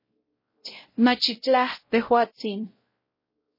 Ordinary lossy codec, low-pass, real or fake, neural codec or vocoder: MP3, 24 kbps; 5.4 kHz; fake; codec, 16 kHz, 0.5 kbps, X-Codec, WavLM features, trained on Multilingual LibriSpeech